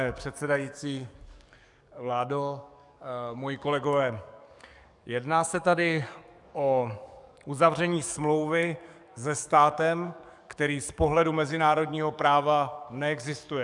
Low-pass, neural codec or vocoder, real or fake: 10.8 kHz; codec, 44.1 kHz, 7.8 kbps, DAC; fake